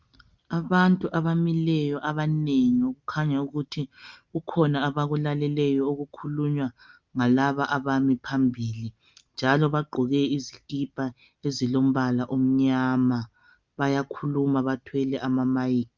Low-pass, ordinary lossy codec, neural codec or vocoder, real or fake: 7.2 kHz; Opus, 24 kbps; none; real